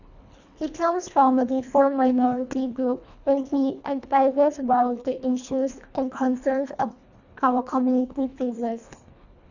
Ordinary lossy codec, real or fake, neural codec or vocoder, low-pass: none; fake; codec, 24 kHz, 1.5 kbps, HILCodec; 7.2 kHz